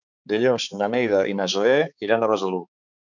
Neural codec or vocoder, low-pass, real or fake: codec, 16 kHz, 4 kbps, X-Codec, HuBERT features, trained on balanced general audio; 7.2 kHz; fake